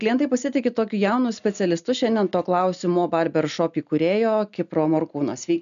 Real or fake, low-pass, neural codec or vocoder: real; 7.2 kHz; none